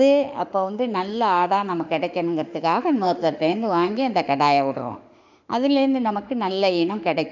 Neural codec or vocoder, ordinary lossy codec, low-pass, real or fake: autoencoder, 48 kHz, 32 numbers a frame, DAC-VAE, trained on Japanese speech; none; 7.2 kHz; fake